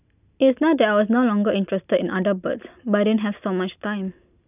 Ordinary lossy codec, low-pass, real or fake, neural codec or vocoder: none; 3.6 kHz; real; none